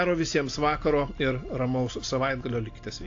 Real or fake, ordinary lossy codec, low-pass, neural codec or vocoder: real; AAC, 48 kbps; 7.2 kHz; none